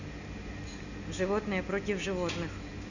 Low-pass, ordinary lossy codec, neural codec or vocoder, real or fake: 7.2 kHz; none; none; real